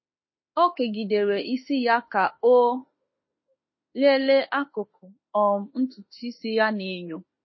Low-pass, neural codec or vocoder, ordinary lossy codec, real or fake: 7.2 kHz; autoencoder, 48 kHz, 32 numbers a frame, DAC-VAE, trained on Japanese speech; MP3, 24 kbps; fake